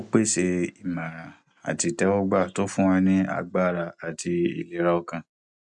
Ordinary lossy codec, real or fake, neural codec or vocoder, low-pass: MP3, 96 kbps; real; none; 10.8 kHz